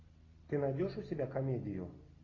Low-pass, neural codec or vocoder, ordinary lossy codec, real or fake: 7.2 kHz; none; AAC, 32 kbps; real